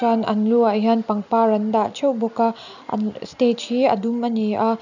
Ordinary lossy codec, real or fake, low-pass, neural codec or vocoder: none; real; 7.2 kHz; none